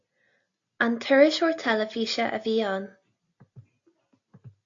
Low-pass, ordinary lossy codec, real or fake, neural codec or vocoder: 7.2 kHz; AAC, 48 kbps; real; none